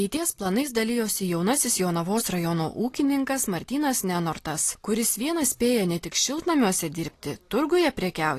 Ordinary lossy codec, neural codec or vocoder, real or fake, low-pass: AAC, 48 kbps; none; real; 14.4 kHz